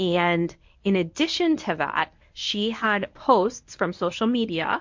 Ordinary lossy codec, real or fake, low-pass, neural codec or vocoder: MP3, 48 kbps; fake; 7.2 kHz; codec, 24 kHz, 0.9 kbps, WavTokenizer, medium speech release version 1